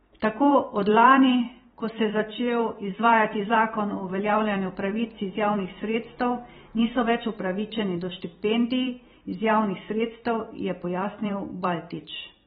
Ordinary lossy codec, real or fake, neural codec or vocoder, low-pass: AAC, 16 kbps; fake; vocoder, 44.1 kHz, 128 mel bands every 512 samples, BigVGAN v2; 19.8 kHz